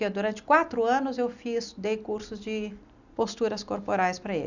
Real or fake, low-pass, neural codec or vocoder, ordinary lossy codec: real; 7.2 kHz; none; none